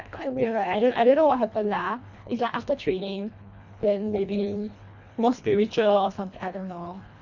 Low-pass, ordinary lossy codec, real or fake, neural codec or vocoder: 7.2 kHz; none; fake; codec, 24 kHz, 1.5 kbps, HILCodec